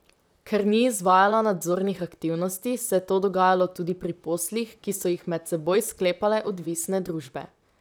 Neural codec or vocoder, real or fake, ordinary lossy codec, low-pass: vocoder, 44.1 kHz, 128 mel bands, Pupu-Vocoder; fake; none; none